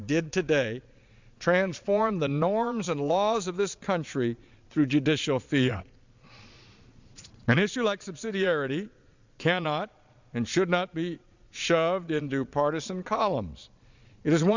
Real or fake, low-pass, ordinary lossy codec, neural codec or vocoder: fake; 7.2 kHz; Opus, 64 kbps; vocoder, 22.05 kHz, 80 mel bands, Vocos